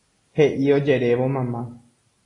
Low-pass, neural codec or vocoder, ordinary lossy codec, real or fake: 10.8 kHz; none; AAC, 32 kbps; real